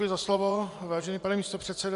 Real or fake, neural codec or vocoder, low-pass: fake; vocoder, 24 kHz, 100 mel bands, Vocos; 10.8 kHz